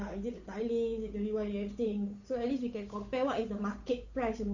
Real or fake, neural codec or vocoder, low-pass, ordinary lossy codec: fake; codec, 16 kHz, 8 kbps, FunCodec, trained on Chinese and English, 25 frames a second; 7.2 kHz; none